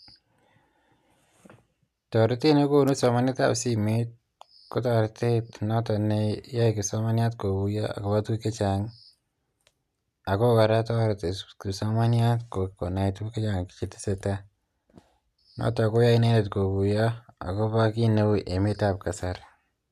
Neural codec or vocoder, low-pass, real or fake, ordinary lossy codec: none; 14.4 kHz; real; none